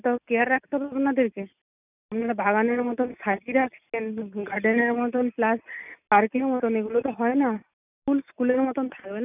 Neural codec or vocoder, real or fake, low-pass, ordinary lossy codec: none; real; 3.6 kHz; none